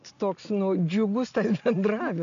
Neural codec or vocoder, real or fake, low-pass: none; real; 7.2 kHz